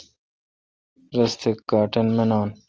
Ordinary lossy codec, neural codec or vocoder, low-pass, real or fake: Opus, 24 kbps; none; 7.2 kHz; real